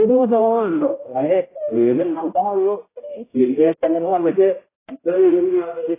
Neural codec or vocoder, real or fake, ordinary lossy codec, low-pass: codec, 16 kHz, 0.5 kbps, X-Codec, HuBERT features, trained on general audio; fake; AAC, 16 kbps; 3.6 kHz